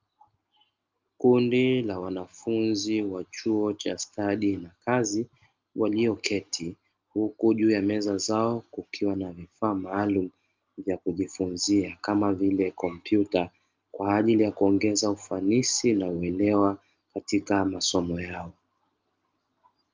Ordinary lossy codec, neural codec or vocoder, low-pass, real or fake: Opus, 24 kbps; none; 7.2 kHz; real